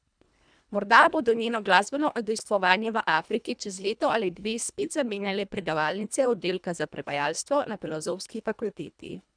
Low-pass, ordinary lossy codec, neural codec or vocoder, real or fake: 9.9 kHz; none; codec, 24 kHz, 1.5 kbps, HILCodec; fake